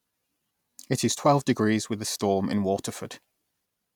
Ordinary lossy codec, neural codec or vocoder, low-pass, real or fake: none; none; 19.8 kHz; real